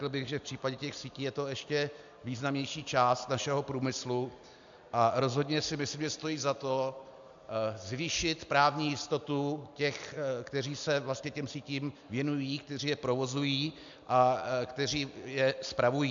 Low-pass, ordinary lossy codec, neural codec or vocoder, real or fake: 7.2 kHz; AAC, 64 kbps; none; real